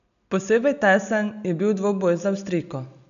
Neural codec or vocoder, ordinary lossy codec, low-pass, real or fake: none; none; 7.2 kHz; real